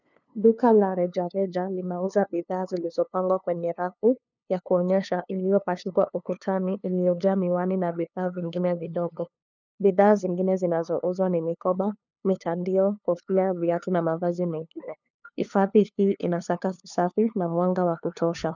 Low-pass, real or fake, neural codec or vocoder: 7.2 kHz; fake; codec, 16 kHz, 2 kbps, FunCodec, trained on LibriTTS, 25 frames a second